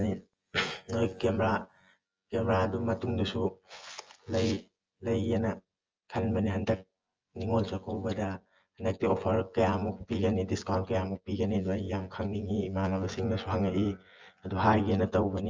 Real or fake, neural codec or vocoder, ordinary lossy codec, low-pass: fake; vocoder, 24 kHz, 100 mel bands, Vocos; Opus, 24 kbps; 7.2 kHz